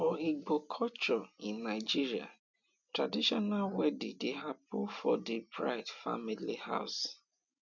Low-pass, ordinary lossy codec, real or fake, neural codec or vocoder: 7.2 kHz; none; real; none